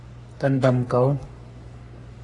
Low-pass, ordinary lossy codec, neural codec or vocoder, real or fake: 10.8 kHz; AAC, 64 kbps; codec, 44.1 kHz, 3.4 kbps, Pupu-Codec; fake